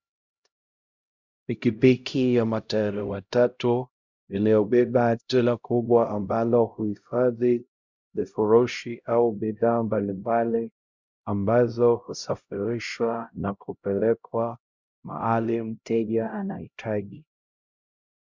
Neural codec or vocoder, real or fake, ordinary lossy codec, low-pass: codec, 16 kHz, 0.5 kbps, X-Codec, HuBERT features, trained on LibriSpeech; fake; Opus, 64 kbps; 7.2 kHz